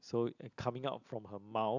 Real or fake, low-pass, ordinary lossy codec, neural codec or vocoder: real; 7.2 kHz; none; none